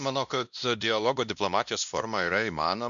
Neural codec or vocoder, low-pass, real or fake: codec, 16 kHz, 1 kbps, X-Codec, WavLM features, trained on Multilingual LibriSpeech; 7.2 kHz; fake